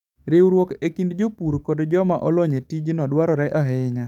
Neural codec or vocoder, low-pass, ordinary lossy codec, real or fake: codec, 44.1 kHz, 7.8 kbps, DAC; 19.8 kHz; none; fake